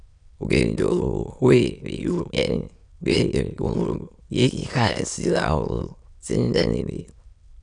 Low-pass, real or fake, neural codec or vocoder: 9.9 kHz; fake; autoencoder, 22.05 kHz, a latent of 192 numbers a frame, VITS, trained on many speakers